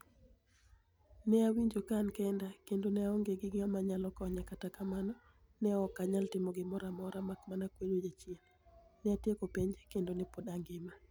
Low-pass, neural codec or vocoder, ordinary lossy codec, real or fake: none; none; none; real